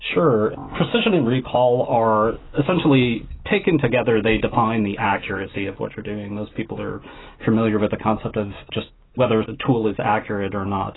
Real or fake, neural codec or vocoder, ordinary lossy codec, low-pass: real; none; AAC, 16 kbps; 7.2 kHz